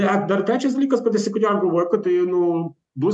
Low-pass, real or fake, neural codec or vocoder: 10.8 kHz; real; none